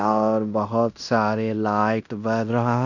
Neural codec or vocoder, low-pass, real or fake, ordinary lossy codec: codec, 16 kHz in and 24 kHz out, 0.9 kbps, LongCat-Audio-Codec, fine tuned four codebook decoder; 7.2 kHz; fake; none